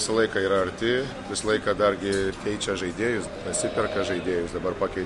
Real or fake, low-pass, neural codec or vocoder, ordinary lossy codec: real; 14.4 kHz; none; MP3, 48 kbps